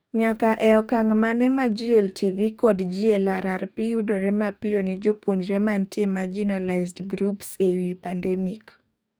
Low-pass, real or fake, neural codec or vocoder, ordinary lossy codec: none; fake; codec, 44.1 kHz, 2.6 kbps, DAC; none